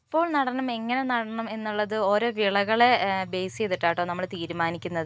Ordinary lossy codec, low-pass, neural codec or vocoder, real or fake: none; none; none; real